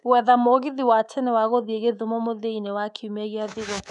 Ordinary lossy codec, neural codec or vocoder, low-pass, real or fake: none; codec, 24 kHz, 3.1 kbps, DualCodec; 10.8 kHz; fake